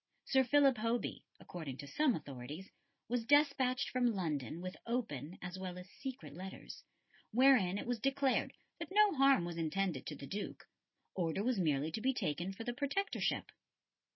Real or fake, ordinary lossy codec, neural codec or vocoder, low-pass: real; MP3, 24 kbps; none; 7.2 kHz